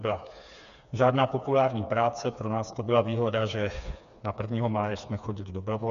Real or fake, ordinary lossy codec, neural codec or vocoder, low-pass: fake; MP3, 96 kbps; codec, 16 kHz, 4 kbps, FreqCodec, smaller model; 7.2 kHz